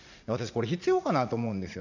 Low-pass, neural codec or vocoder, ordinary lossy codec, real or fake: 7.2 kHz; vocoder, 44.1 kHz, 80 mel bands, Vocos; none; fake